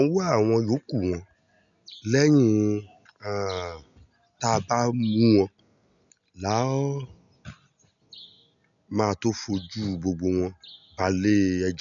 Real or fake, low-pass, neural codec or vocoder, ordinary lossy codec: real; 7.2 kHz; none; none